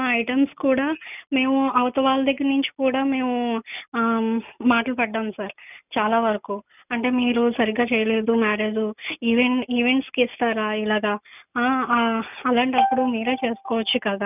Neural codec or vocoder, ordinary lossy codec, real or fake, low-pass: none; none; real; 3.6 kHz